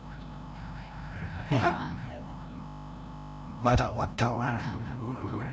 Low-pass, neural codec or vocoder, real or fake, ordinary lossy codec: none; codec, 16 kHz, 0.5 kbps, FreqCodec, larger model; fake; none